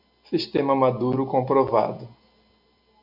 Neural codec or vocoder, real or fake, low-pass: none; real; 5.4 kHz